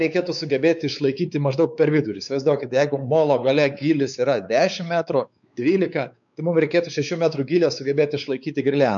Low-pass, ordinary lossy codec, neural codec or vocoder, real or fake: 7.2 kHz; MP3, 96 kbps; codec, 16 kHz, 4 kbps, X-Codec, WavLM features, trained on Multilingual LibriSpeech; fake